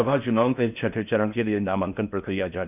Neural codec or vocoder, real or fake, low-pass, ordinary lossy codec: codec, 16 kHz in and 24 kHz out, 0.6 kbps, FocalCodec, streaming, 4096 codes; fake; 3.6 kHz; none